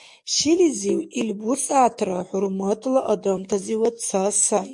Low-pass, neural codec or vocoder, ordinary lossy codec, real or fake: 10.8 kHz; codec, 44.1 kHz, 7.8 kbps, DAC; MP3, 64 kbps; fake